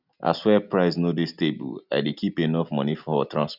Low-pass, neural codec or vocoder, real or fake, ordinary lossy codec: 5.4 kHz; none; real; none